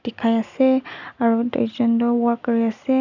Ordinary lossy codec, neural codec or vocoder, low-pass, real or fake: none; none; 7.2 kHz; real